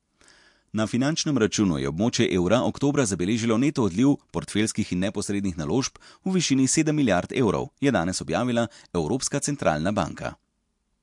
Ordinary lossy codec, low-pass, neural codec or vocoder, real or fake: MP3, 64 kbps; 10.8 kHz; none; real